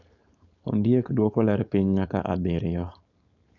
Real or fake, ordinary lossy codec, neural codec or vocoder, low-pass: fake; none; codec, 16 kHz, 4.8 kbps, FACodec; 7.2 kHz